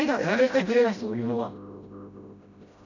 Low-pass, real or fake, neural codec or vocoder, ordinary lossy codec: 7.2 kHz; fake; codec, 16 kHz, 0.5 kbps, FreqCodec, smaller model; none